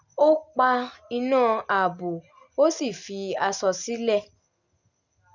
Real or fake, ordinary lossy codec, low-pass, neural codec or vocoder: real; none; 7.2 kHz; none